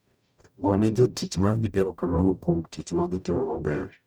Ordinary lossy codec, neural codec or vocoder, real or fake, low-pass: none; codec, 44.1 kHz, 0.9 kbps, DAC; fake; none